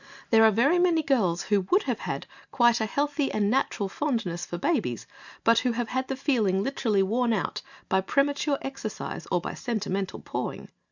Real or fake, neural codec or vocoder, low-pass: real; none; 7.2 kHz